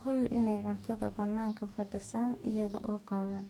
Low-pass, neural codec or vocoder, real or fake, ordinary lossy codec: 19.8 kHz; codec, 44.1 kHz, 2.6 kbps, DAC; fake; none